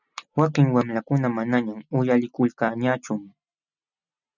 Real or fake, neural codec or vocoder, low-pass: real; none; 7.2 kHz